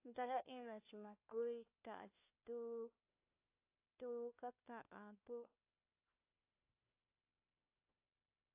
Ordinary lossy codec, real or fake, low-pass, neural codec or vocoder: AAC, 32 kbps; fake; 3.6 kHz; codec, 16 kHz, 1 kbps, FunCodec, trained on LibriTTS, 50 frames a second